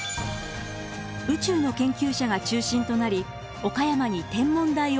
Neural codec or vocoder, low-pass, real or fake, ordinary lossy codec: none; none; real; none